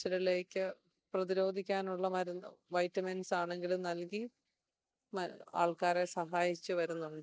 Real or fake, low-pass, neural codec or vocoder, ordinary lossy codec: real; none; none; none